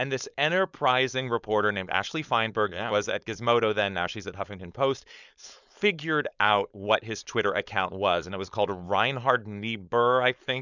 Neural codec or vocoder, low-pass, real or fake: codec, 16 kHz, 4.8 kbps, FACodec; 7.2 kHz; fake